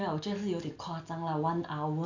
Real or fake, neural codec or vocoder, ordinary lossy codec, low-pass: real; none; AAC, 48 kbps; 7.2 kHz